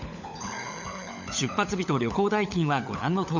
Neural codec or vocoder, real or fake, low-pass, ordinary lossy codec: codec, 16 kHz, 16 kbps, FunCodec, trained on LibriTTS, 50 frames a second; fake; 7.2 kHz; none